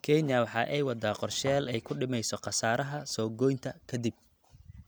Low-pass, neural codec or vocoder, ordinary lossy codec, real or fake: none; none; none; real